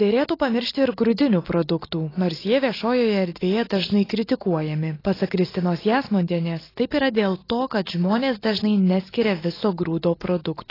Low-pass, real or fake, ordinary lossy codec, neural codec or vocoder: 5.4 kHz; real; AAC, 24 kbps; none